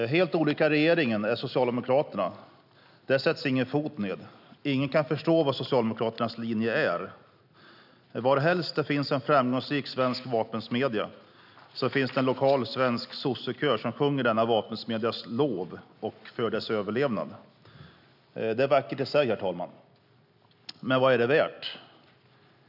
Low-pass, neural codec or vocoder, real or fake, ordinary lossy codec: 5.4 kHz; none; real; none